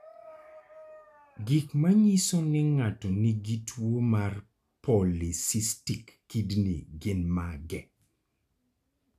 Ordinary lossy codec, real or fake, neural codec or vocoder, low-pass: none; real; none; 14.4 kHz